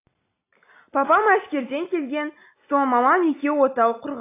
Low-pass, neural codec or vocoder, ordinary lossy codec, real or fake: 3.6 kHz; none; AAC, 24 kbps; real